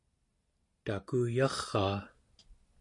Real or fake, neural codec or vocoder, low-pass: real; none; 10.8 kHz